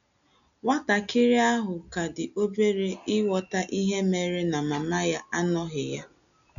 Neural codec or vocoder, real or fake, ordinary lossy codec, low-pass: none; real; none; 7.2 kHz